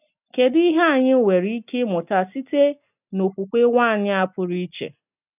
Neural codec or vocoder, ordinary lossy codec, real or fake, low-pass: none; none; real; 3.6 kHz